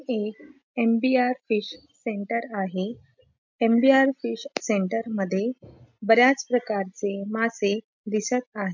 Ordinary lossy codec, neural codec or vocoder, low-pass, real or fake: MP3, 64 kbps; none; 7.2 kHz; real